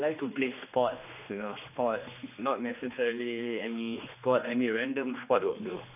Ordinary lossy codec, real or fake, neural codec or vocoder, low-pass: none; fake; codec, 16 kHz, 2 kbps, X-Codec, HuBERT features, trained on general audio; 3.6 kHz